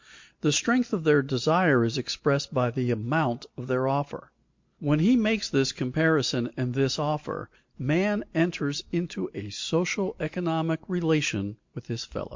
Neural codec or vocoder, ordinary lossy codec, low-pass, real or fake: none; MP3, 48 kbps; 7.2 kHz; real